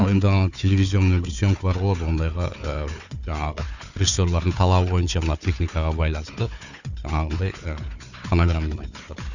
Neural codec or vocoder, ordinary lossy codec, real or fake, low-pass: codec, 16 kHz, 4 kbps, FunCodec, trained on Chinese and English, 50 frames a second; none; fake; 7.2 kHz